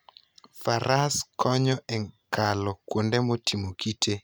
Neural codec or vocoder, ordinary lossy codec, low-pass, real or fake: none; none; none; real